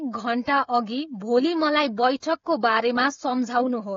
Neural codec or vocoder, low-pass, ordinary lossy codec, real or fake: codec, 16 kHz, 16 kbps, FreqCodec, smaller model; 7.2 kHz; AAC, 32 kbps; fake